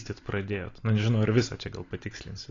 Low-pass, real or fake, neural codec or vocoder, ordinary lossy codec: 7.2 kHz; real; none; AAC, 32 kbps